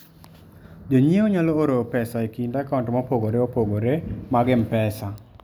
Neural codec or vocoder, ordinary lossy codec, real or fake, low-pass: none; none; real; none